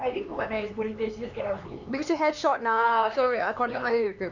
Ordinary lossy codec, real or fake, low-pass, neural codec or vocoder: none; fake; 7.2 kHz; codec, 16 kHz, 4 kbps, X-Codec, HuBERT features, trained on LibriSpeech